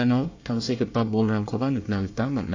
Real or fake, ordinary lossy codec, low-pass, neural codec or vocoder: fake; AAC, 48 kbps; 7.2 kHz; codec, 24 kHz, 1 kbps, SNAC